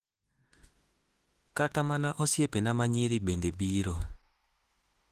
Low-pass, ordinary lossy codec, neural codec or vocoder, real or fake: 14.4 kHz; Opus, 16 kbps; autoencoder, 48 kHz, 32 numbers a frame, DAC-VAE, trained on Japanese speech; fake